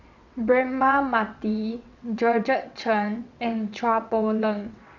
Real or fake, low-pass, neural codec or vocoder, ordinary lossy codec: fake; 7.2 kHz; vocoder, 22.05 kHz, 80 mel bands, WaveNeXt; none